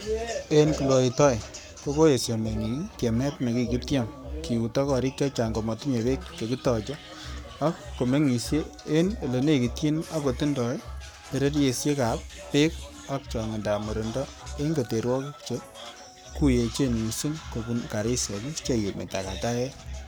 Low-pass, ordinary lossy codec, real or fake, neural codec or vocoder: none; none; fake; codec, 44.1 kHz, 7.8 kbps, Pupu-Codec